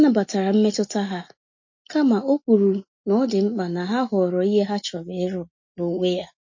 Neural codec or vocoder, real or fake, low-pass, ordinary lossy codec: none; real; 7.2 kHz; MP3, 32 kbps